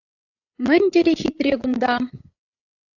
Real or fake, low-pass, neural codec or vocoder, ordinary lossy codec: fake; 7.2 kHz; codec, 16 kHz, 8 kbps, FreqCodec, larger model; AAC, 48 kbps